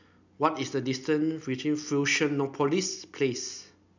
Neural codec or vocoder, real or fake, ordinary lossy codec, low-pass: none; real; none; 7.2 kHz